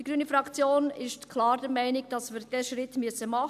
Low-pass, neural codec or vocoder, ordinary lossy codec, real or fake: 14.4 kHz; none; none; real